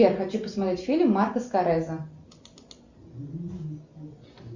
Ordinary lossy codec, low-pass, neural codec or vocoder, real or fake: Opus, 64 kbps; 7.2 kHz; none; real